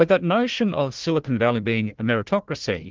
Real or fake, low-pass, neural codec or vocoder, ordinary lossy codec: fake; 7.2 kHz; codec, 16 kHz, 1 kbps, FunCodec, trained on Chinese and English, 50 frames a second; Opus, 16 kbps